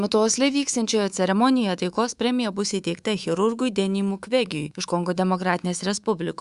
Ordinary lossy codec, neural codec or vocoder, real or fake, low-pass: Opus, 64 kbps; codec, 24 kHz, 3.1 kbps, DualCodec; fake; 10.8 kHz